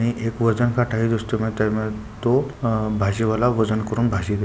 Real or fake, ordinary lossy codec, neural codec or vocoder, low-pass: real; none; none; none